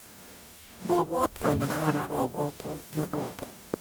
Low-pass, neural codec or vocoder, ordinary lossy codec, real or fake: none; codec, 44.1 kHz, 0.9 kbps, DAC; none; fake